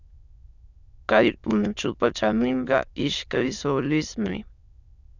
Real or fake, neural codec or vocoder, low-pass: fake; autoencoder, 22.05 kHz, a latent of 192 numbers a frame, VITS, trained on many speakers; 7.2 kHz